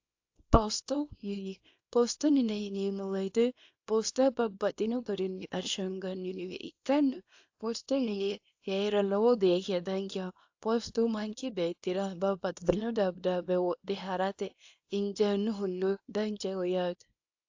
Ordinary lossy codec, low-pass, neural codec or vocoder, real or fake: AAC, 48 kbps; 7.2 kHz; codec, 24 kHz, 0.9 kbps, WavTokenizer, small release; fake